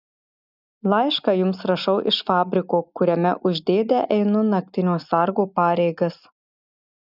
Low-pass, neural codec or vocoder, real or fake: 5.4 kHz; none; real